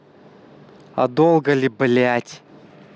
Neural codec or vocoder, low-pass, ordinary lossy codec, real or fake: none; none; none; real